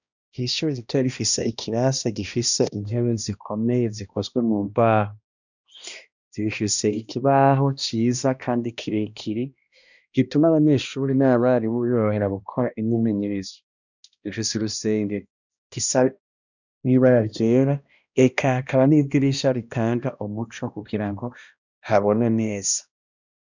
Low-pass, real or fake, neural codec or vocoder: 7.2 kHz; fake; codec, 16 kHz, 1 kbps, X-Codec, HuBERT features, trained on balanced general audio